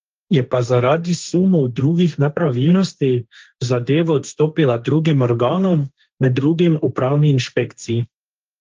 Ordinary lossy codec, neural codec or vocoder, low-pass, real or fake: Opus, 24 kbps; codec, 16 kHz, 1.1 kbps, Voila-Tokenizer; 7.2 kHz; fake